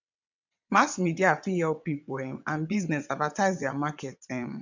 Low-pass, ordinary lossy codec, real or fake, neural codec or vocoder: 7.2 kHz; none; fake; vocoder, 22.05 kHz, 80 mel bands, WaveNeXt